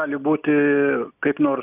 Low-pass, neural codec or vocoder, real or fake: 3.6 kHz; none; real